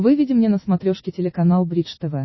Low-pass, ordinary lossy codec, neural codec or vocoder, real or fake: 7.2 kHz; MP3, 24 kbps; none; real